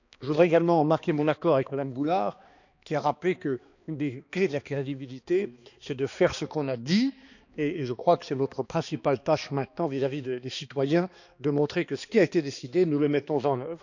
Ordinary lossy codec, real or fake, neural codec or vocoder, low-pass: none; fake; codec, 16 kHz, 2 kbps, X-Codec, HuBERT features, trained on balanced general audio; 7.2 kHz